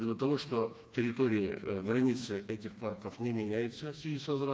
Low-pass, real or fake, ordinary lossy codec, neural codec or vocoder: none; fake; none; codec, 16 kHz, 2 kbps, FreqCodec, smaller model